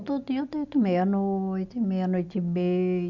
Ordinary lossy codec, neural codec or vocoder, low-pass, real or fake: none; none; 7.2 kHz; real